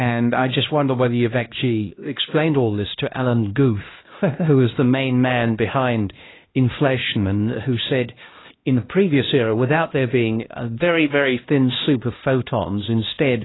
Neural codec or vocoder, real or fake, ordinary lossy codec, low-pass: codec, 16 kHz, 1 kbps, X-Codec, HuBERT features, trained on LibriSpeech; fake; AAC, 16 kbps; 7.2 kHz